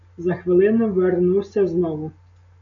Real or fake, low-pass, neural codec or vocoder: real; 7.2 kHz; none